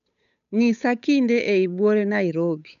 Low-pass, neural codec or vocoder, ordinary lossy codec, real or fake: 7.2 kHz; codec, 16 kHz, 8 kbps, FunCodec, trained on Chinese and English, 25 frames a second; none; fake